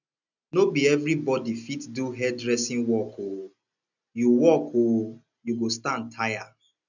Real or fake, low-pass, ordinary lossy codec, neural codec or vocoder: real; 7.2 kHz; none; none